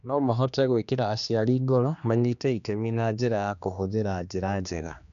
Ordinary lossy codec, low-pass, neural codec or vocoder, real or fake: none; 7.2 kHz; codec, 16 kHz, 2 kbps, X-Codec, HuBERT features, trained on general audio; fake